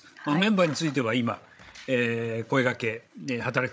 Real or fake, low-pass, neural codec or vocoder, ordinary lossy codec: fake; none; codec, 16 kHz, 16 kbps, FreqCodec, larger model; none